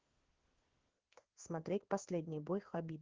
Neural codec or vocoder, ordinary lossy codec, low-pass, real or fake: codec, 16 kHz in and 24 kHz out, 1 kbps, XY-Tokenizer; Opus, 16 kbps; 7.2 kHz; fake